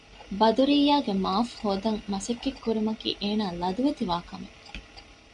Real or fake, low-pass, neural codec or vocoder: real; 10.8 kHz; none